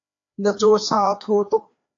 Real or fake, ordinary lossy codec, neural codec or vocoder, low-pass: fake; AAC, 48 kbps; codec, 16 kHz, 2 kbps, FreqCodec, larger model; 7.2 kHz